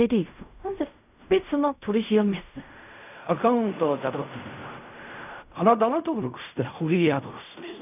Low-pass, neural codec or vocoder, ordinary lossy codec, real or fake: 3.6 kHz; codec, 16 kHz in and 24 kHz out, 0.4 kbps, LongCat-Audio-Codec, fine tuned four codebook decoder; none; fake